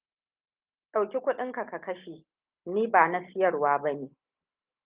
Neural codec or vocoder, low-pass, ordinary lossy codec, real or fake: none; 3.6 kHz; Opus, 32 kbps; real